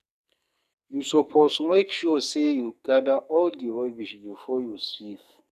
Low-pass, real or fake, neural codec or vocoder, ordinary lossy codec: 14.4 kHz; fake; codec, 44.1 kHz, 2.6 kbps, SNAC; none